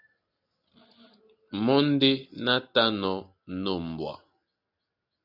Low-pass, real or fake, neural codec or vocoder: 5.4 kHz; real; none